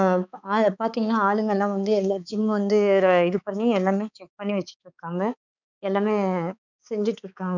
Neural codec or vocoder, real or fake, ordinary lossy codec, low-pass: codec, 16 kHz, 4 kbps, X-Codec, HuBERT features, trained on balanced general audio; fake; none; 7.2 kHz